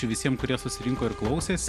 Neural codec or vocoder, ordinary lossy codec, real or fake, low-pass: none; MP3, 96 kbps; real; 14.4 kHz